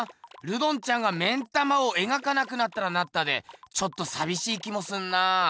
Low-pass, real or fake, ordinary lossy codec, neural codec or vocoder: none; real; none; none